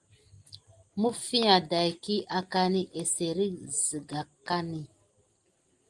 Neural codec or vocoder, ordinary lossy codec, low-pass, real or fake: none; Opus, 24 kbps; 10.8 kHz; real